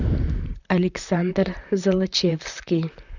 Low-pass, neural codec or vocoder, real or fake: 7.2 kHz; vocoder, 44.1 kHz, 128 mel bands, Pupu-Vocoder; fake